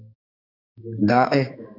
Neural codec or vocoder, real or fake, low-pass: codec, 16 kHz, 2 kbps, X-Codec, HuBERT features, trained on balanced general audio; fake; 5.4 kHz